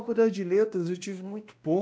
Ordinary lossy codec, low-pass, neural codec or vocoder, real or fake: none; none; codec, 16 kHz, 1 kbps, X-Codec, WavLM features, trained on Multilingual LibriSpeech; fake